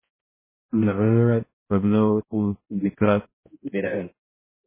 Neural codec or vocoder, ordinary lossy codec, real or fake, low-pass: codec, 16 kHz, 0.5 kbps, X-Codec, HuBERT features, trained on balanced general audio; MP3, 16 kbps; fake; 3.6 kHz